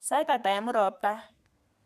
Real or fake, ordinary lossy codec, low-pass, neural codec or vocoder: fake; none; 14.4 kHz; codec, 32 kHz, 1.9 kbps, SNAC